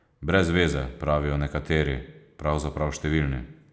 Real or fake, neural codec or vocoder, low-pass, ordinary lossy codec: real; none; none; none